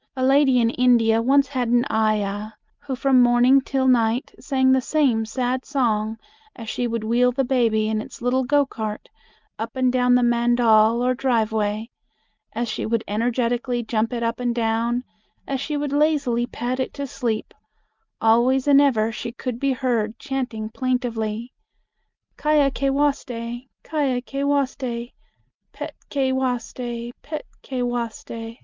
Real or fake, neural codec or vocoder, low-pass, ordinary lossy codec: real; none; 7.2 kHz; Opus, 24 kbps